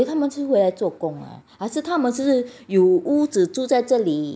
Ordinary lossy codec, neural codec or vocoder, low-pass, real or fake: none; none; none; real